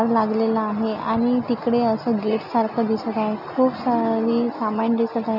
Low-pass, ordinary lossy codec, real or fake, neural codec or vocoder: 5.4 kHz; none; real; none